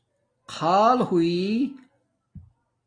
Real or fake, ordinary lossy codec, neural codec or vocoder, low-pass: real; AAC, 32 kbps; none; 9.9 kHz